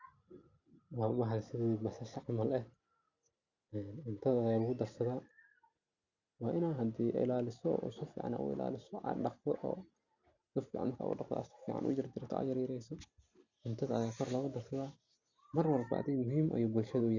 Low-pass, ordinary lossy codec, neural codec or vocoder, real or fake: 7.2 kHz; Opus, 64 kbps; none; real